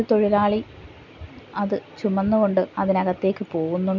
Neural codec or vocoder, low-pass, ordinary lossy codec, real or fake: none; 7.2 kHz; none; real